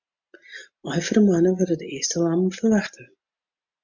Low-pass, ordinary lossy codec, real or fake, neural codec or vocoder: 7.2 kHz; MP3, 64 kbps; real; none